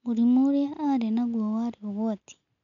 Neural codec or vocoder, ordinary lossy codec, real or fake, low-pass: none; none; real; 7.2 kHz